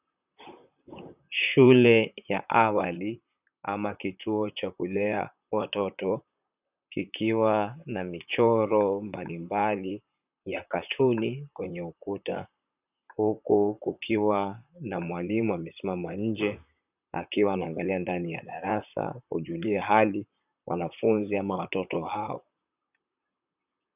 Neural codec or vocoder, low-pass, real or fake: vocoder, 44.1 kHz, 128 mel bands, Pupu-Vocoder; 3.6 kHz; fake